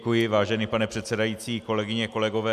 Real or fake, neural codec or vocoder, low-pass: real; none; 14.4 kHz